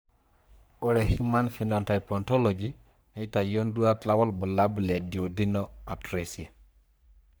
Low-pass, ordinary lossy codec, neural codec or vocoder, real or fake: none; none; codec, 44.1 kHz, 3.4 kbps, Pupu-Codec; fake